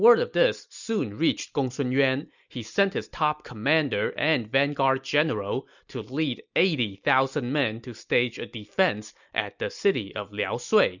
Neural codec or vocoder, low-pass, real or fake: none; 7.2 kHz; real